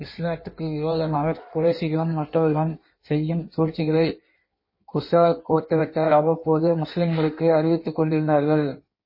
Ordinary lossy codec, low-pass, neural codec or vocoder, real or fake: MP3, 24 kbps; 5.4 kHz; codec, 16 kHz in and 24 kHz out, 1.1 kbps, FireRedTTS-2 codec; fake